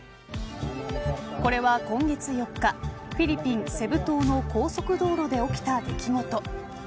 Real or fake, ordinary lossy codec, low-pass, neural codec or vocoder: real; none; none; none